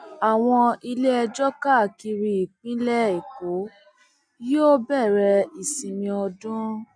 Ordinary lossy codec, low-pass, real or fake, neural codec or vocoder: none; 9.9 kHz; real; none